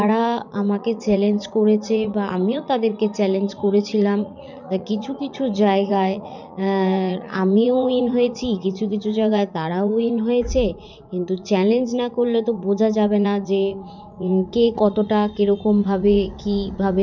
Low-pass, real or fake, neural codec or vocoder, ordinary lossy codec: 7.2 kHz; fake; vocoder, 44.1 kHz, 80 mel bands, Vocos; none